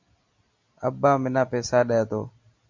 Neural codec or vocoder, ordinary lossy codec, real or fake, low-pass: none; MP3, 48 kbps; real; 7.2 kHz